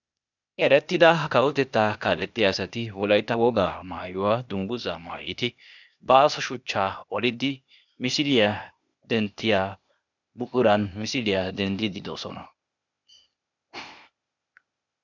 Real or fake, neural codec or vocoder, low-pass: fake; codec, 16 kHz, 0.8 kbps, ZipCodec; 7.2 kHz